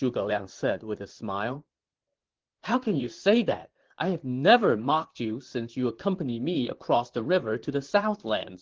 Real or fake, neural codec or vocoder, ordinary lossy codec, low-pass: fake; vocoder, 44.1 kHz, 128 mel bands, Pupu-Vocoder; Opus, 16 kbps; 7.2 kHz